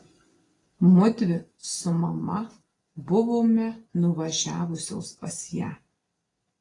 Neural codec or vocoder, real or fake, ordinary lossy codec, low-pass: none; real; AAC, 32 kbps; 10.8 kHz